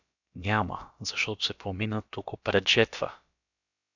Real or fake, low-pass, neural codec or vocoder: fake; 7.2 kHz; codec, 16 kHz, about 1 kbps, DyCAST, with the encoder's durations